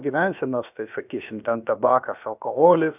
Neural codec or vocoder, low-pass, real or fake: codec, 16 kHz, about 1 kbps, DyCAST, with the encoder's durations; 3.6 kHz; fake